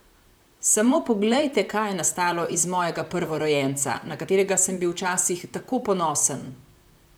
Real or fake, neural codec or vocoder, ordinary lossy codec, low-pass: fake; vocoder, 44.1 kHz, 128 mel bands, Pupu-Vocoder; none; none